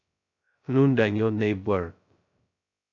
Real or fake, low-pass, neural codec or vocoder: fake; 7.2 kHz; codec, 16 kHz, 0.2 kbps, FocalCodec